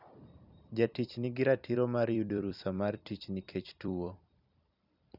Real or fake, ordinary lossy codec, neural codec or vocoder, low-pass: fake; none; vocoder, 44.1 kHz, 128 mel bands every 256 samples, BigVGAN v2; 5.4 kHz